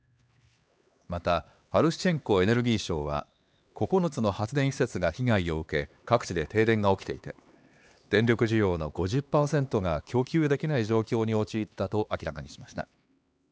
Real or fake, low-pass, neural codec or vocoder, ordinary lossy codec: fake; none; codec, 16 kHz, 2 kbps, X-Codec, HuBERT features, trained on LibriSpeech; none